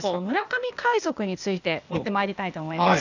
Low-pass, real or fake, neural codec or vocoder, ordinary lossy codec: 7.2 kHz; fake; codec, 16 kHz, 0.8 kbps, ZipCodec; none